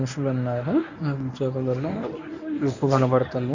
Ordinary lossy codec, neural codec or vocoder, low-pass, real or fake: none; codec, 24 kHz, 0.9 kbps, WavTokenizer, medium speech release version 1; 7.2 kHz; fake